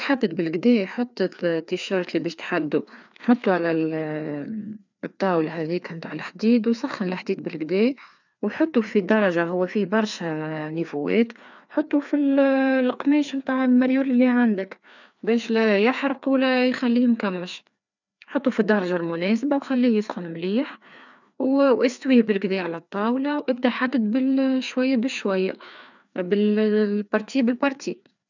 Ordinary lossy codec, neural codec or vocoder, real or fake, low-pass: none; codec, 16 kHz, 2 kbps, FreqCodec, larger model; fake; 7.2 kHz